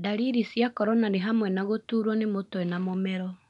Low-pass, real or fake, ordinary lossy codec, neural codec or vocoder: 10.8 kHz; real; none; none